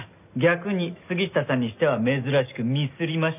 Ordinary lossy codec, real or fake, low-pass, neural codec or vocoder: none; real; 3.6 kHz; none